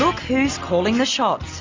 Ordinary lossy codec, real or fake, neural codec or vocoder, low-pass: MP3, 64 kbps; real; none; 7.2 kHz